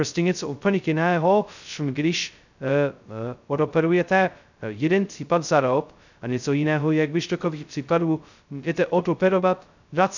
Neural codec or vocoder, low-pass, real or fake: codec, 16 kHz, 0.2 kbps, FocalCodec; 7.2 kHz; fake